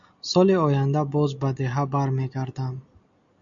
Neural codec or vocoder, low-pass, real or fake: none; 7.2 kHz; real